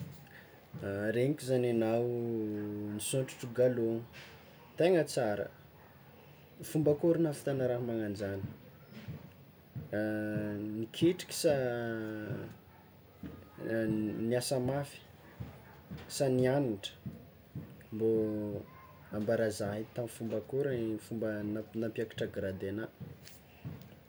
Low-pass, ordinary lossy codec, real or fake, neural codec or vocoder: none; none; real; none